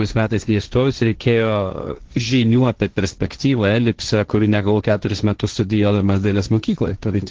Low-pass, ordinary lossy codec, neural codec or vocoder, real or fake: 7.2 kHz; Opus, 16 kbps; codec, 16 kHz, 1.1 kbps, Voila-Tokenizer; fake